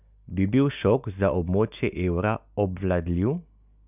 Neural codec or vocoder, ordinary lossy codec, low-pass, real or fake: none; none; 3.6 kHz; real